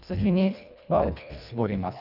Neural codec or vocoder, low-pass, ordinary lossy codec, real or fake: codec, 24 kHz, 1.5 kbps, HILCodec; 5.4 kHz; none; fake